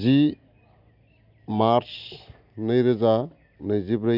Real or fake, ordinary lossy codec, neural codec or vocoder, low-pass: real; none; none; 5.4 kHz